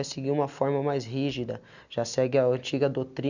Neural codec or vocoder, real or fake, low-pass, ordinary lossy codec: none; real; 7.2 kHz; none